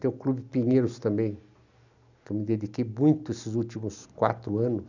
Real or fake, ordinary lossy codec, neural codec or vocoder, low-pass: real; none; none; 7.2 kHz